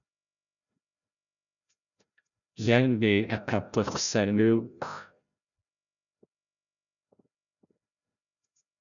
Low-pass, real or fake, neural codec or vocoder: 7.2 kHz; fake; codec, 16 kHz, 0.5 kbps, FreqCodec, larger model